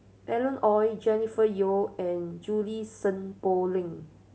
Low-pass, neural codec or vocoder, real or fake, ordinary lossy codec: none; none; real; none